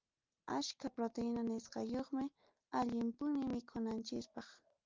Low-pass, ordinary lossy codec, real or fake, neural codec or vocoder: 7.2 kHz; Opus, 24 kbps; real; none